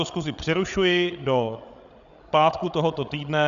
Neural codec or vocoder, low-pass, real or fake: codec, 16 kHz, 16 kbps, FreqCodec, larger model; 7.2 kHz; fake